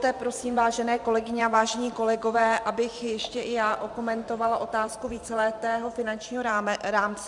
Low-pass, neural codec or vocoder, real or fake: 10.8 kHz; vocoder, 44.1 kHz, 128 mel bands every 512 samples, BigVGAN v2; fake